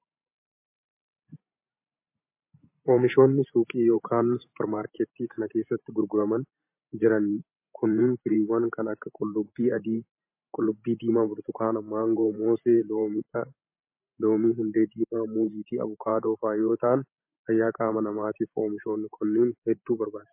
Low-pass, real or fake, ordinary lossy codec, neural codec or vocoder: 3.6 kHz; fake; MP3, 24 kbps; vocoder, 44.1 kHz, 128 mel bands every 512 samples, BigVGAN v2